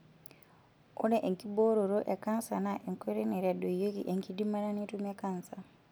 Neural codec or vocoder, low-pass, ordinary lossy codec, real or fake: none; none; none; real